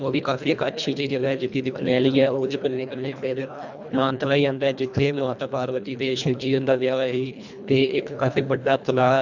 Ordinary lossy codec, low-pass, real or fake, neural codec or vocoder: none; 7.2 kHz; fake; codec, 24 kHz, 1.5 kbps, HILCodec